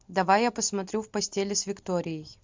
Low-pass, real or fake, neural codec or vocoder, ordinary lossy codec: 7.2 kHz; real; none; MP3, 64 kbps